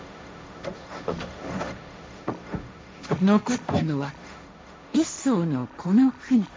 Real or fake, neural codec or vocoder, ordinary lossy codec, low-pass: fake; codec, 16 kHz, 1.1 kbps, Voila-Tokenizer; none; none